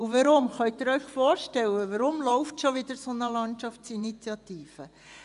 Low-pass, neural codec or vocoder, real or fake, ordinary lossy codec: 10.8 kHz; vocoder, 24 kHz, 100 mel bands, Vocos; fake; none